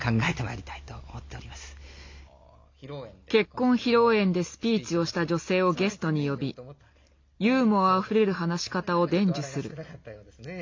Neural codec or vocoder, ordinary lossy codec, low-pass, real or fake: none; MP3, 48 kbps; 7.2 kHz; real